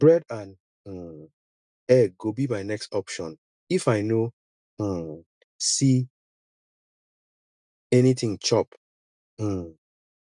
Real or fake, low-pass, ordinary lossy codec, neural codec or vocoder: real; 10.8 kHz; none; none